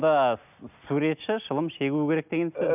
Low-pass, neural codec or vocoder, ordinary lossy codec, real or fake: 3.6 kHz; none; none; real